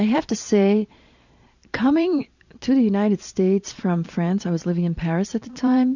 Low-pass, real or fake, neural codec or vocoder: 7.2 kHz; fake; vocoder, 44.1 kHz, 128 mel bands every 512 samples, BigVGAN v2